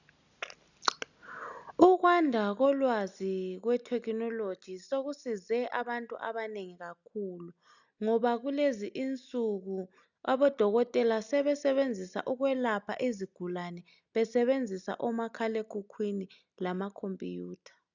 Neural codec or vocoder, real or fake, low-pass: none; real; 7.2 kHz